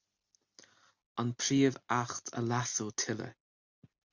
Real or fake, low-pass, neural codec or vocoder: real; 7.2 kHz; none